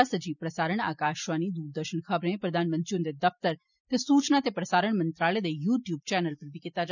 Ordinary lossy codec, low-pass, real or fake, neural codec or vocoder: none; 7.2 kHz; real; none